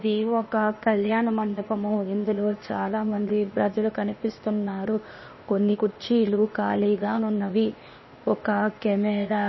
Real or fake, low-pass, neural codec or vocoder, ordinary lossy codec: fake; 7.2 kHz; codec, 16 kHz, 0.8 kbps, ZipCodec; MP3, 24 kbps